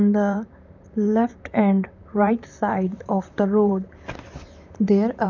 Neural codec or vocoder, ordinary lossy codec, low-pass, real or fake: vocoder, 44.1 kHz, 128 mel bands, Pupu-Vocoder; none; 7.2 kHz; fake